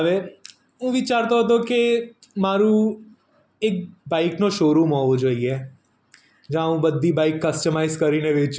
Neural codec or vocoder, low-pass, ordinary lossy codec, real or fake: none; none; none; real